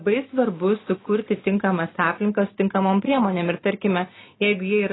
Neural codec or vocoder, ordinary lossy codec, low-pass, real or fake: none; AAC, 16 kbps; 7.2 kHz; real